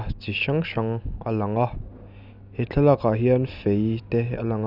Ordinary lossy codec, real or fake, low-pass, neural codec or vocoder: none; real; 5.4 kHz; none